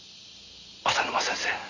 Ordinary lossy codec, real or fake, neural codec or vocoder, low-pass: none; real; none; 7.2 kHz